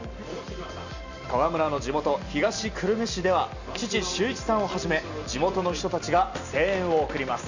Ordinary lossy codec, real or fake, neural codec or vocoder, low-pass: none; real; none; 7.2 kHz